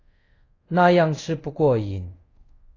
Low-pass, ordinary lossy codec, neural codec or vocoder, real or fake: 7.2 kHz; AAC, 32 kbps; codec, 24 kHz, 0.5 kbps, DualCodec; fake